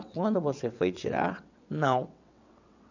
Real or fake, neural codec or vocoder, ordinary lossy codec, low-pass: fake; vocoder, 22.05 kHz, 80 mel bands, WaveNeXt; none; 7.2 kHz